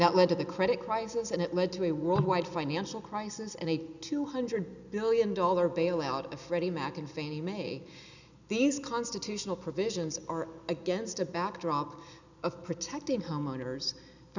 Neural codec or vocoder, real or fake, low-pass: none; real; 7.2 kHz